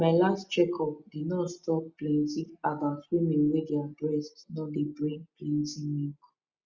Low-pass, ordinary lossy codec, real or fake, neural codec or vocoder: 7.2 kHz; Opus, 64 kbps; real; none